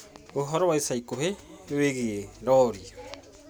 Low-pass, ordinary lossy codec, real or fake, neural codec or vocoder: none; none; real; none